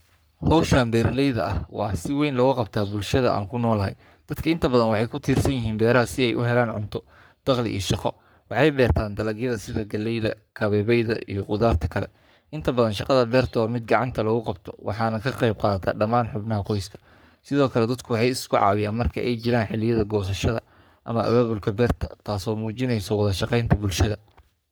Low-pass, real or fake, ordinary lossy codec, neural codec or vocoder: none; fake; none; codec, 44.1 kHz, 3.4 kbps, Pupu-Codec